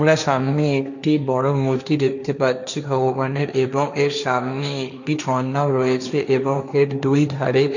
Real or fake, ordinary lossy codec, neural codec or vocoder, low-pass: fake; none; codec, 16 kHz, 1.1 kbps, Voila-Tokenizer; 7.2 kHz